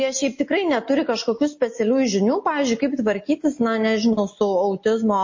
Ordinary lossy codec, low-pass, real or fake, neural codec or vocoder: MP3, 32 kbps; 7.2 kHz; real; none